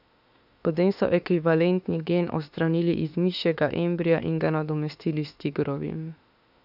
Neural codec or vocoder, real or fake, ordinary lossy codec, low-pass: autoencoder, 48 kHz, 32 numbers a frame, DAC-VAE, trained on Japanese speech; fake; none; 5.4 kHz